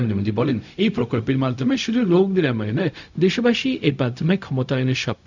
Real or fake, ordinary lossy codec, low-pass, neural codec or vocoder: fake; none; 7.2 kHz; codec, 16 kHz, 0.4 kbps, LongCat-Audio-Codec